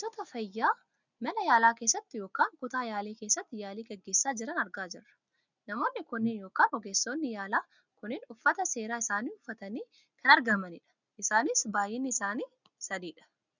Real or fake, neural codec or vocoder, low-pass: real; none; 7.2 kHz